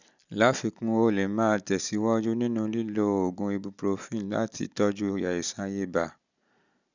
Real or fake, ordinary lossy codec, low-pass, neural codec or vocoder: real; none; 7.2 kHz; none